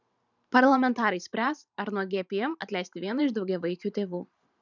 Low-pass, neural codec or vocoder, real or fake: 7.2 kHz; vocoder, 44.1 kHz, 128 mel bands every 512 samples, BigVGAN v2; fake